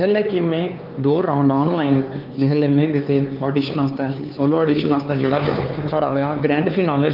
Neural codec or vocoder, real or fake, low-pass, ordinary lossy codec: codec, 16 kHz, 4 kbps, X-Codec, HuBERT features, trained on LibriSpeech; fake; 5.4 kHz; Opus, 24 kbps